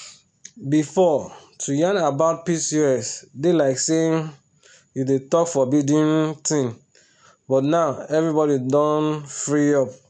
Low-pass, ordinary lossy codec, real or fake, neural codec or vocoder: 9.9 kHz; none; real; none